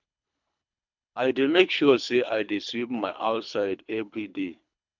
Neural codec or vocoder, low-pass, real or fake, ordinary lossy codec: codec, 24 kHz, 3 kbps, HILCodec; 7.2 kHz; fake; MP3, 64 kbps